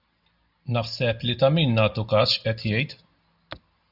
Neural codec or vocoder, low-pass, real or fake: none; 5.4 kHz; real